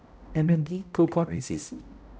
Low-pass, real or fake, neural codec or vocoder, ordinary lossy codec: none; fake; codec, 16 kHz, 0.5 kbps, X-Codec, HuBERT features, trained on balanced general audio; none